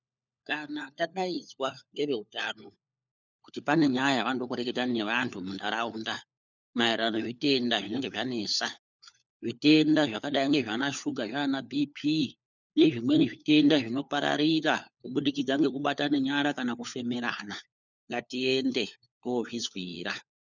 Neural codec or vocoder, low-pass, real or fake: codec, 16 kHz, 4 kbps, FunCodec, trained on LibriTTS, 50 frames a second; 7.2 kHz; fake